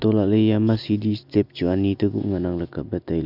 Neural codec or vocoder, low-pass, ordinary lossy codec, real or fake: none; 5.4 kHz; none; real